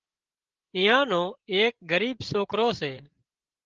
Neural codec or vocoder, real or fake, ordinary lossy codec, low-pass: codec, 16 kHz, 16 kbps, FreqCodec, larger model; fake; Opus, 16 kbps; 7.2 kHz